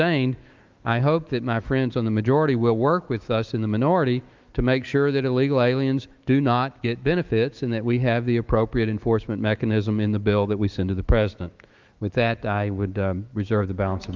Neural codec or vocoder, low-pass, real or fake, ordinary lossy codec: autoencoder, 48 kHz, 128 numbers a frame, DAC-VAE, trained on Japanese speech; 7.2 kHz; fake; Opus, 32 kbps